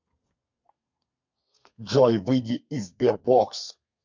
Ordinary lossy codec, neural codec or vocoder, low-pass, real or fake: MP3, 48 kbps; codec, 32 kHz, 1.9 kbps, SNAC; 7.2 kHz; fake